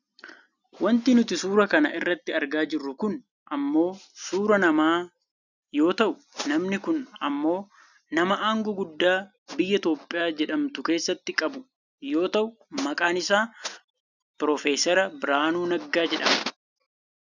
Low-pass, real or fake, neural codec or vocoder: 7.2 kHz; real; none